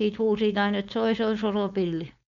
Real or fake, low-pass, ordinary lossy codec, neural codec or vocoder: fake; 7.2 kHz; none; codec, 16 kHz, 4.8 kbps, FACodec